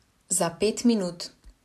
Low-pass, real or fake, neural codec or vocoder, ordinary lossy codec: 14.4 kHz; real; none; none